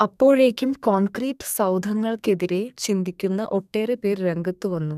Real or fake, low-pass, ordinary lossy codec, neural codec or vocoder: fake; 14.4 kHz; none; codec, 32 kHz, 1.9 kbps, SNAC